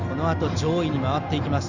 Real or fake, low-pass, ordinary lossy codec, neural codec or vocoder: real; 7.2 kHz; Opus, 64 kbps; none